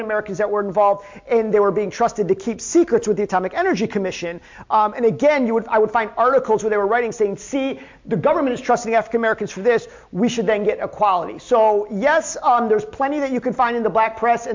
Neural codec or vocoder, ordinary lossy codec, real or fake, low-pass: none; MP3, 48 kbps; real; 7.2 kHz